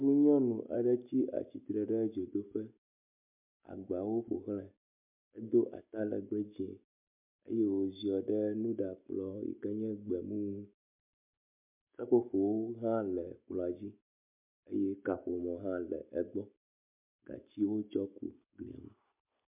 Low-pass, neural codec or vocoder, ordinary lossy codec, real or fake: 3.6 kHz; none; MP3, 32 kbps; real